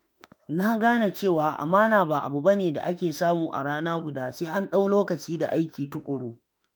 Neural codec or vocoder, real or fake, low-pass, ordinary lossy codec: autoencoder, 48 kHz, 32 numbers a frame, DAC-VAE, trained on Japanese speech; fake; none; none